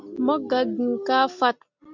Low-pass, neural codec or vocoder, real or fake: 7.2 kHz; none; real